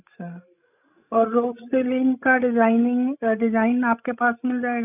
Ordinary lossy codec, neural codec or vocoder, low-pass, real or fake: none; codec, 16 kHz, 16 kbps, FreqCodec, larger model; 3.6 kHz; fake